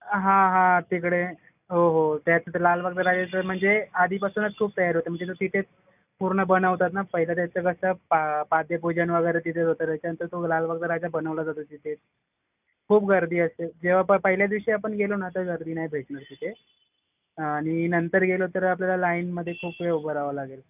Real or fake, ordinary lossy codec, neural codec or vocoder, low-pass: real; none; none; 3.6 kHz